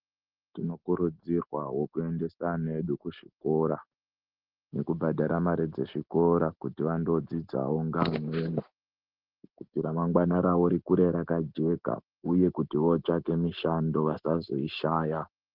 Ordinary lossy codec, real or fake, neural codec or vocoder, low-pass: Opus, 16 kbps; real; none; 5.4 kHz